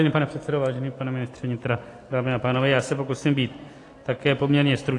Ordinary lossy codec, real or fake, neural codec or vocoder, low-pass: AAC, 48 kbps; real; none; 10.8 kHz